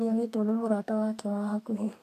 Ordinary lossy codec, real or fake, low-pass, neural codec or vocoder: AAC, 64 kbps; fake; 14.4 kHz; codec, 32 kHz, 1.9 kbps, SNAC